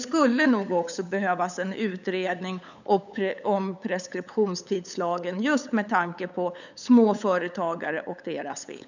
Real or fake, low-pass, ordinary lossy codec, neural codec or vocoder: fake; 7.2 kHz; none; codec, 16 kHz, 8 kbps, FunCodec, trained on LibriTTS, 25 frames a second